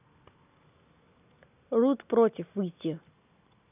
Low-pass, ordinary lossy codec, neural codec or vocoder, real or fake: 3.6 kHz; none; none; real